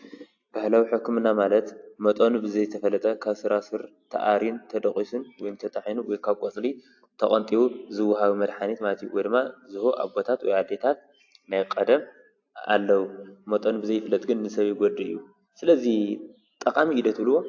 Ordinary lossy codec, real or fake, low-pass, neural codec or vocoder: AAC, 48 kbps; real; 7.2 kHz; none